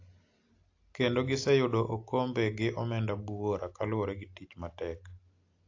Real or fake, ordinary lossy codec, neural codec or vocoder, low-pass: real; AAC, 48 kbps; none; 7.2 kHz